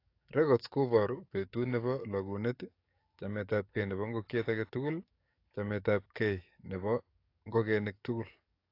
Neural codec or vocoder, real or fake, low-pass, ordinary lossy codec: codec, 44.1 kHz, 7.8 kbps, DAC; fake; 5.4 kHz; AAC, 32 kbps